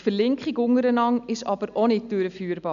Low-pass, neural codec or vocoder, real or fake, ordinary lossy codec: 7.2 kHz; none; real; none